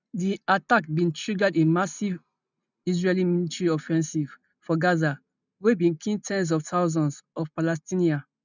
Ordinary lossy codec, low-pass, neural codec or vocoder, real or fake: none; 7.2 kHz; none; real